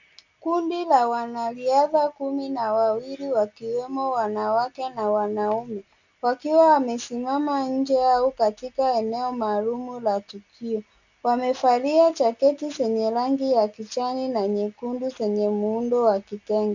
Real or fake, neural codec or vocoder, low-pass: real; none; 7.2 kHz